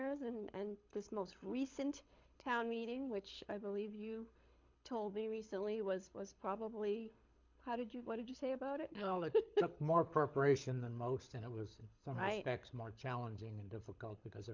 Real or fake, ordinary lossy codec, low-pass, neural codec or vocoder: fake; MP3, 64 kbps; 7.2 kHz; codec, 24 kHz, 6 kbps, HILCodec